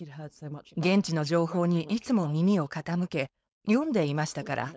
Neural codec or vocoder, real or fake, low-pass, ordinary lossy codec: codec, 16 kHz, 4.8 kbps, FACodec; fake; none; none